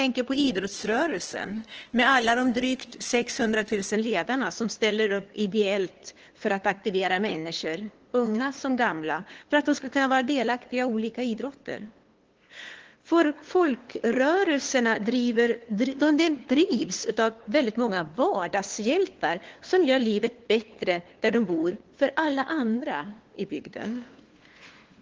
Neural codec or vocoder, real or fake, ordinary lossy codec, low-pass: codec, 16 kHz, 2 kbps, FunCodec, trained on LibriTTS, 25 frames a second; fake; Opus, 16 kbps; 7.2 kHz